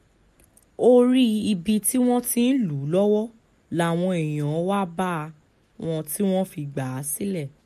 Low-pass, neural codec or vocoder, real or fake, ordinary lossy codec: 14.4 kHz; none; real; MP3, 64 kbps